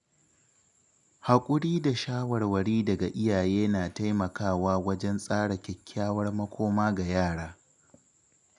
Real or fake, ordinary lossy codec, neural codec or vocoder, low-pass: real; none; none; 10.8 kHz